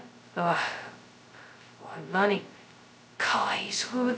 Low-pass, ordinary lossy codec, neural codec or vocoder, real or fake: none; none; codec, 16 kHz, 0.2 kbps, FocalCodec; fake